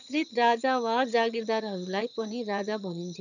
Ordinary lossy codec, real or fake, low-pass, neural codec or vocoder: none; fake; 7.2 kHz; vocoder, 22.05 kHz, 80 mel bands, HiFi-GAN